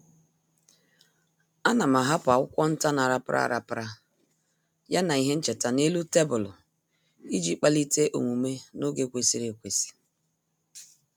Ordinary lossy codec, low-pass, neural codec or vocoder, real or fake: none; none; none; real